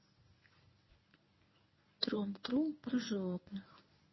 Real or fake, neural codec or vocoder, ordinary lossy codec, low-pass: fake; codec, 24 kHz, 0.9 kbps, WavTokenizer, medium speech release version 1; MP3, 24 kbps; 7.2 kHz